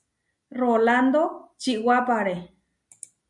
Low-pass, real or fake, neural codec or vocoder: 10.8 kHz; real; none